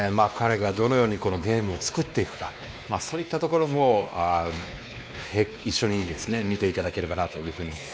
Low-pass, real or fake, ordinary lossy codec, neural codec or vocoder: none; fake; none; codec, 16 kHz, 2 kbps, X-Codec, WavLM features, trained on Multilingual LibriSpeech